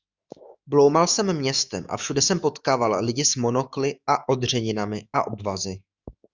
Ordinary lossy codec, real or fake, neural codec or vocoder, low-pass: Opus, 64 kbps; fake; autoencoder, 48 kHz, 128 numbers a frame, DAC-VAE, trained on Japanese speech; 7.2 kHz